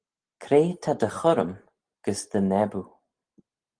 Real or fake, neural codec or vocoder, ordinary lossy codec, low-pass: real; none; Opus, 24 kbps; 9.9 kHz